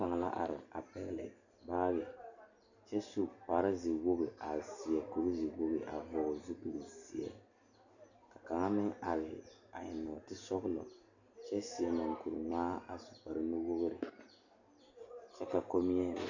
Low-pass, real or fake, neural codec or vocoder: 7.2 kHz; real; none